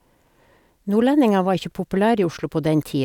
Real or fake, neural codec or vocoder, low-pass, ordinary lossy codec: real; none; 19.8 kHz; none